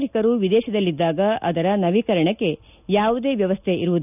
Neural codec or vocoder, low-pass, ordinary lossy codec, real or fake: none; 3.6 kHz; none; real